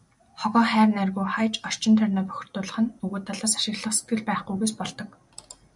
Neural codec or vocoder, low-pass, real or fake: none; 10.8 kHz; real